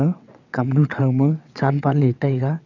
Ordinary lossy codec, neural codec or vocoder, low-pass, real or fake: none; vocoder, 22.05 kHz, 80 mel bands, WaveNeXt; 7.2 kHz; fake